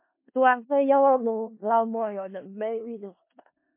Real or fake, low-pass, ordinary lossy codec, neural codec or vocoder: fake; 3.6 kHz; MP3, 24 kbps; codec, 16 kHz in and 24 kHz out, 0.4 kbps, LongCat-Audio-Codec, four codebook decoder